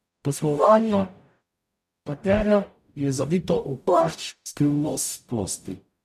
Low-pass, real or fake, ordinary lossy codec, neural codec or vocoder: 14.4 kHz; fake; none; codec, 44.1 kHz, 0.9 kbps, DAC